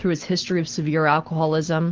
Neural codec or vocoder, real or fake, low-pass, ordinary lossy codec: none; real; 7.2 kHz; Opus, 16 kbps